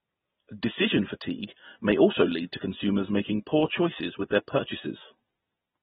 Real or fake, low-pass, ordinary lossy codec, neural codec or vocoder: real; 19.8 kHz; AAC, 16 kbps; none